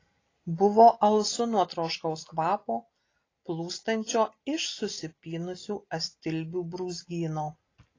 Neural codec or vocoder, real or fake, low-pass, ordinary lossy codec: none; real; 7.2 kHz; AAC, 32 kbps